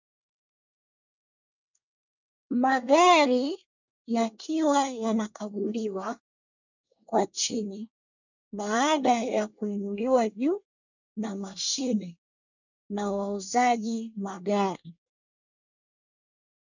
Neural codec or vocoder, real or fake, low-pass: codec, 24 kHz, 1 kbps, SNAC; fake; 7.2 kHz